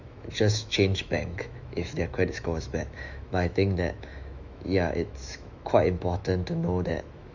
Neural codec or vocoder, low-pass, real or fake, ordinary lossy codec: autoencoder, 48 kHz, 128 numbers a frame, DAC-VAE, trained on Japanese speech; 7.2 kHz; fake; none